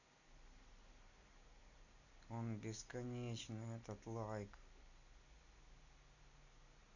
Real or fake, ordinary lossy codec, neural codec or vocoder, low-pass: real; none; none; 7.2 kHz